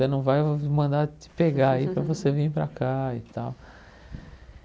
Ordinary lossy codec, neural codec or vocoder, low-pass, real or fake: none; none; none; real